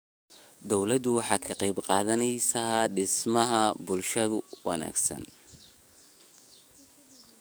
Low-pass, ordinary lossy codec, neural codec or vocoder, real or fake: none; none; codec, 44.1 kHz, 7.8 kbps, DAC; fake